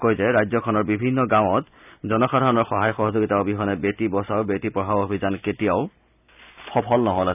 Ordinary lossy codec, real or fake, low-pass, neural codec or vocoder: none; real; 3.6 kHz; none